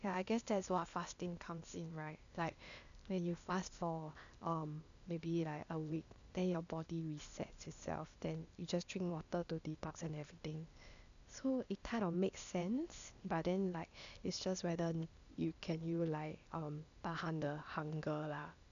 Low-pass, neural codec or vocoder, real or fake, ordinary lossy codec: 7.2 kHz; codec, 16 kHz, 0.8 kbps, ZipCodec; fake; none